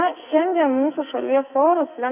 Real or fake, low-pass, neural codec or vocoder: fake; 3.6 kHz; codec, 44.1 kHz, 2.6 kbps, SNAC